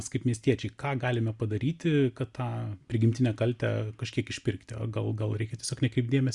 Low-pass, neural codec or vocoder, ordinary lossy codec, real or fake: 10.8 kHz; none; Opus, 64 kbps; real